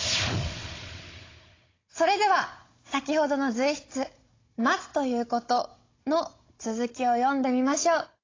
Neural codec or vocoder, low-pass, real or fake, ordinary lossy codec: codec, 16 kHz, 16 kbps, FunCodec, trained on Chinese and English, 50 frames a second; 7.2 kHz; fake; AAC, 32 kbps